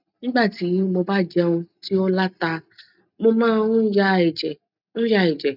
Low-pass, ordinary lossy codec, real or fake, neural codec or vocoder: 5.4 kHz; none; real; none